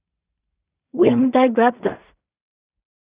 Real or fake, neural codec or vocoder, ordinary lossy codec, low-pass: fake; codec, 16 kHz in and 24 kHz out, 0.4 kbps, LongCat-Audio-Codec, two codebook decoder; Opus, 64 kbps; 3.6 kHz